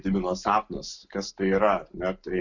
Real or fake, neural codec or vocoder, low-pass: real; none; 7.2 kHz